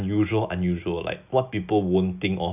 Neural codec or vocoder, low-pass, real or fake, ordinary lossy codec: none; 3.6 kHz; real; none